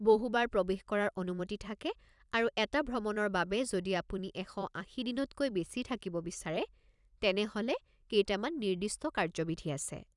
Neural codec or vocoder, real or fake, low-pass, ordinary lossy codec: vocoder, 44.1 kHz, 128 mel bands every 256 samples, BigVGAN v2; fake; 10.8 kHz; none